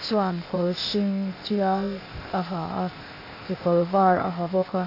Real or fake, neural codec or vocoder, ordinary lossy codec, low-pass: fake; codec, 16 kHz, 0.8 kbps, ZipCodec; AAC, 32 kbps; 5.4 kHz